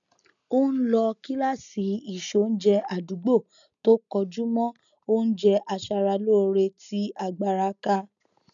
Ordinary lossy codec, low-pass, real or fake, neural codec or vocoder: none; 7.2 kHz; real; none